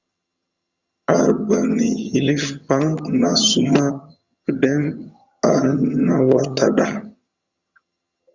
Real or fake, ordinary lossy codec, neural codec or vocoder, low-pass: fake; Opus, 64 kbps; vocoder, 22.05 kHz, 80 mel bands, HiFi-GAN; 7.2 kHz